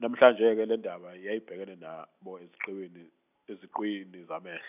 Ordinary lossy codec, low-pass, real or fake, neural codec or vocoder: none; 3.6 kHz; real; none